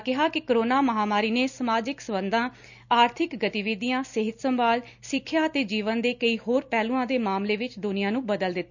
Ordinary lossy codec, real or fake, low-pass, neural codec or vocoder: none; real; 7.2 kHz; none